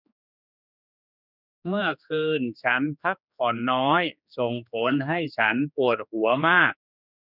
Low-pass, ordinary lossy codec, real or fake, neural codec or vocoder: 5.4 kHz; none; fake; codec, 16 kHz, 2 kbps, X-Codec, HuBERT features, trained on general audio